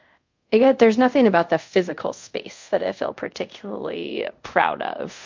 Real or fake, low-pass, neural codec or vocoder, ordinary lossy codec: fake; 7.2 kHz; codec, 24 kHz, 0.5 kbps, DualCodec; MP3, 48 kbps